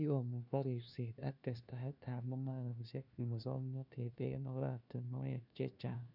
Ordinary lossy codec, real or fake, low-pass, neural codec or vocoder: none; fake; 5.4 kHz; codec, 16 kHz, 1 kbps, FunCodec, trained on LibriTTS, 50 frames a second